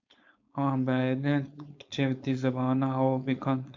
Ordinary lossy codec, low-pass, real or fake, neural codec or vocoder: MP3, 64 kbps; 7.2 kHz; fake; codec, 16 kHz, 4.8 kbps, FACodec